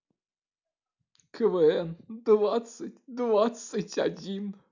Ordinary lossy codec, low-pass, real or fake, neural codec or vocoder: none; 7.2 kHz; real; none